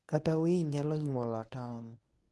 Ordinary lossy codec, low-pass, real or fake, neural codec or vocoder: none; none; fake; codec, 24 kHz, 0.9 kbps, WavTokenizer, medium speech release version 1